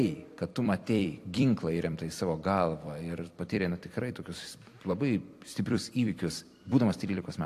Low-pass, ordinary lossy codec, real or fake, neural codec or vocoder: 14.4 kHz; AAC, 64 kbps; fake; vocoder, 44.1 kHz, 128 mel bands every 256 samples, BigVGAN v2